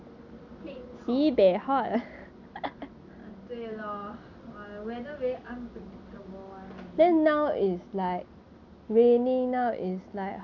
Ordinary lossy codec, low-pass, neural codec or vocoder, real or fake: none; 7.2 kHz; none; real